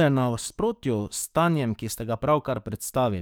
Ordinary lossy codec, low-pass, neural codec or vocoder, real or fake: none; none; codec, 44.1 kHz, 7.8 kbps, DAC; fake